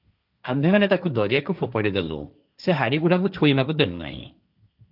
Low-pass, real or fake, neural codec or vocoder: 5.4 kHz; fake; codec, 16 kHz, 1.1 kbps, Voila-Tokenizer